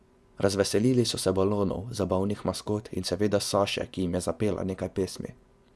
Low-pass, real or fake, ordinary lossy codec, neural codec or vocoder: none; fake; none; vocoder, 24 kHz, 100 mel bands, Vocos